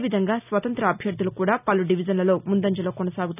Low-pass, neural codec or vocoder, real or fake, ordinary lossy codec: 3.6 kHz; none; real; none